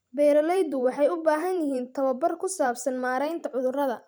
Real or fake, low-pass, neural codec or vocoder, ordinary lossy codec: fake; none; vocoder, 44.1 kHz, 128 mel bands every 512 samples, BigVGAN v2; none